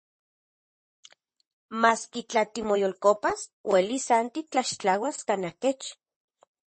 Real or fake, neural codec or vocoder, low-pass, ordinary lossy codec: fake; vocoder, 44.1 kHz, 128 mel bands, Pupu-Vocoder; 10.8 kHz; MP3, 32 kbps